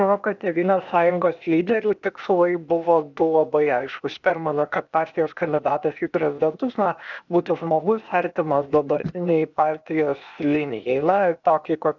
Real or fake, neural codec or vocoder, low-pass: fake; codec, 16 kHz, 0.8 kbps, ZipCodec; 7.2 kHz